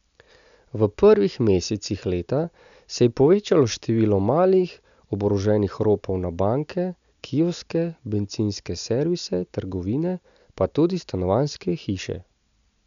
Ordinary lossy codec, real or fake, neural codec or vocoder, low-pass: none; real; none; 7.2 kHz